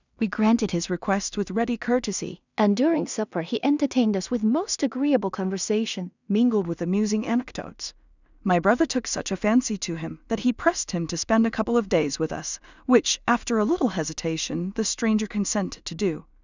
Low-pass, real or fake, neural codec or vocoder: 7.2 kHz; fake; codec, 16 kHz in and 24 kHz out, 0.4 kbps, LongCat-Audio-Codec, two codebook decoder